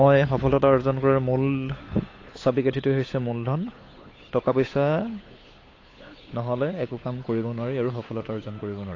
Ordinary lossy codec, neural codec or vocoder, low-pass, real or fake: AAC, 32 kbps; codec, 16 kHz, 8 kbps, FunCodec, trained on Chinese and English, 25 frames a second; 7.2 kHz; fake